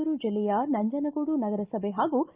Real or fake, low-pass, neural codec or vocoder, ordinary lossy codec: real; 3.6 kHz; none; Opus, 24 kbps